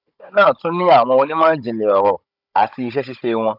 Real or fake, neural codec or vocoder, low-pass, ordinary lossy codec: fake; codec, 16 kHz, 16 kbps, FunCodec, trained on Chinese and English, 50 frames a second; 5.4 kHz; none